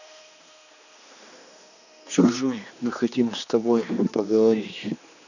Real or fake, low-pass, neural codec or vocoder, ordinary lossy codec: fake; 7.2 kHz; codec, 16 kHz, 2 kbps, X-Codec, HuBERT features, trained on general audio; none